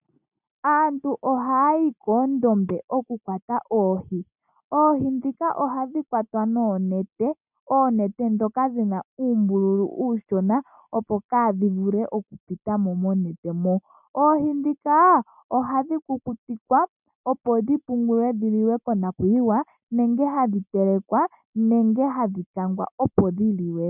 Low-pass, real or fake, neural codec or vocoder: 3.6 kHz; real; none